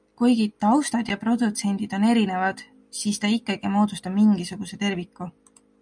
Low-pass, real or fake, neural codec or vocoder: 9.9 kHz; real; none